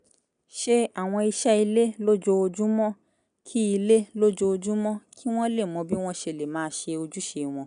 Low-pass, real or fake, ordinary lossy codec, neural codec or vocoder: 9.9 kHz; real; none; none